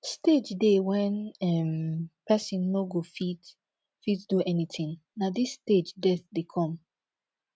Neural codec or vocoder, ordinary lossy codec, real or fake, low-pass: none; none; real; none